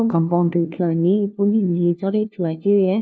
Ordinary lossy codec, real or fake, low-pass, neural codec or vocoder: none; fake; none; codec, 16 kHz, 1 kbps, FunCodec, trained on LibriTTS, 50 frames a second